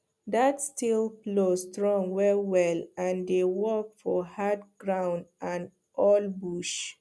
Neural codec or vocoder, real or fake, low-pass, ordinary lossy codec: none; real; none; none